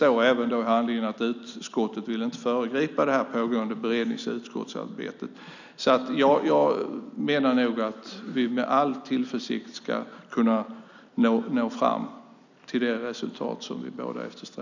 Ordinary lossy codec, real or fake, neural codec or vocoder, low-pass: none; real; none; 7.2 kHz